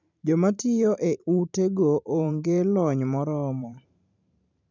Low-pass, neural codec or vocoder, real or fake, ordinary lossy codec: 7.2 kHz; vocoder, 44.1 kHz, 128 mel bands every 512 samples, BigVGAN v2; fake; none